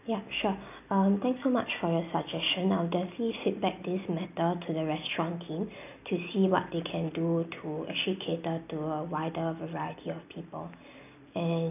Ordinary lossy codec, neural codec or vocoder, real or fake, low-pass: none; none; real; 3.6 kHz